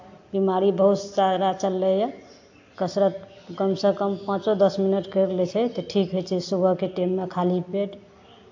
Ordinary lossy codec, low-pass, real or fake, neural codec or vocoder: AAC, 48 kbps; 7.2 kHz; real; none